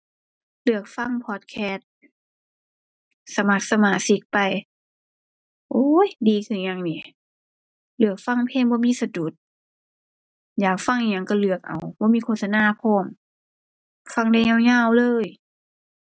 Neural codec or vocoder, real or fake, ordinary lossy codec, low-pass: none; real; none; none